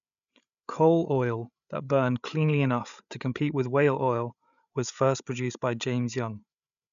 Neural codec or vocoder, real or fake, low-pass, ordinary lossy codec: codec, 16 kHz, 8 kbps, FreqCodec, larger model; fake; 7.2 kHz; none